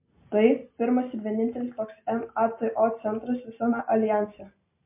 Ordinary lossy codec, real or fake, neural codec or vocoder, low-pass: MP3, 24 kbps; real; none; 3.6 kHz